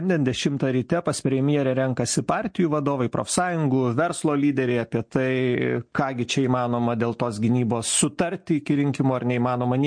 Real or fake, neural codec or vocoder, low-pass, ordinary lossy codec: real; none; 9.9 kHz; MP3, 48 kbps